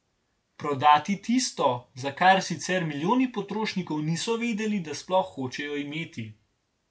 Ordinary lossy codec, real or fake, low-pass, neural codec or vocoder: none; real; none; none